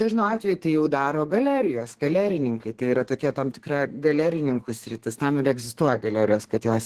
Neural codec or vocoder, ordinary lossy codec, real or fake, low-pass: codec, 32 kHz, 1.9 kbps, SNAC; Opus, 16 kbps; fake; 14.4 kHz